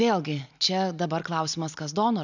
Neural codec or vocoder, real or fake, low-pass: none; real; 7.2 kHz